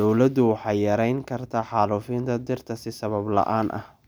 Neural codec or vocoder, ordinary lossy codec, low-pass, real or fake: none; none; none; real